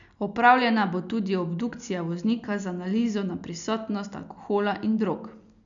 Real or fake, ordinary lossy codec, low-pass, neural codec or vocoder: real; none; 7.2 kHz; none